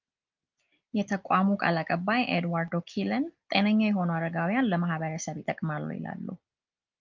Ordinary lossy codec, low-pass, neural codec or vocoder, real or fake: Opus, 24 kbps; 7.2 kHz; none; real